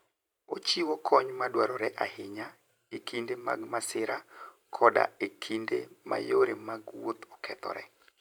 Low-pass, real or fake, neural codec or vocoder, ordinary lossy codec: none; fake; vocoder, 44.1 kHz, 128 mel bands every 512 samples, BigVGAN v2; none